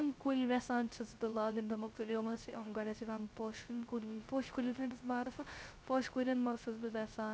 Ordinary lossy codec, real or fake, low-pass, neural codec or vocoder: none; fake; none; codec, 16 kHz, 0.3 kbps, FocalCodec